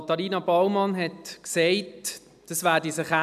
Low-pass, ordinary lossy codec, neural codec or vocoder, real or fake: 14.4 kHz; none; none; real